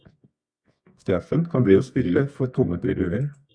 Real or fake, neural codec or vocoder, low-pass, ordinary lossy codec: fake; codec, 24 kHz, 0.9 kbps, WavTokenizer, medium music audio release; 9.9 kHz; Opus, 64 kbps